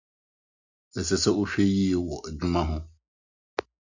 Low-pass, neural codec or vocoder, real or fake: 7.2 kHz; none; real